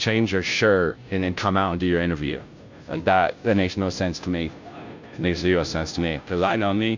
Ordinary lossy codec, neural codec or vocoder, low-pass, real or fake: MP3, 64 kbps; codec, 16 kHz, 0.5 kbps, FunCodec, trained on Chinese and English, 25 frames a second; 7.2 kHz; fake